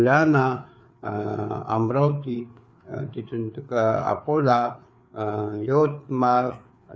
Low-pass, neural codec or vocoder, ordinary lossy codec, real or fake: none; codec, 16 kHz, 4 kbps, FreqCodec, larger model; none; fake